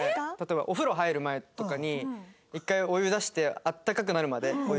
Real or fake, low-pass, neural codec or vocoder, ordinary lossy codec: real; none; none; none